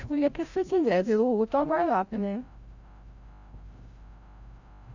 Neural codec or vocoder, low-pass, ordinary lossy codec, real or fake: codec, 16 kHz, 0.5 kbps, FreqCodec, larger model; 7.2 kHz; Opus, 64 kbps; fake